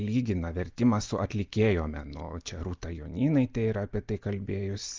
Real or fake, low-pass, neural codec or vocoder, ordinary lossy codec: real; 7.2 kHz; none; Opus, 32 kbps